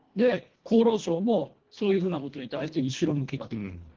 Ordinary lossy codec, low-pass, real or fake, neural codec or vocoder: Opus, 16 kbps; 7.2 kHz; fake; codec, 24 kHz, 1.5 kbps, HILCodec